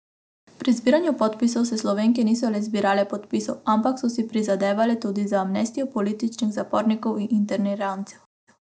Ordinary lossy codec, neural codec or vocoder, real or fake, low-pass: none; none; real; none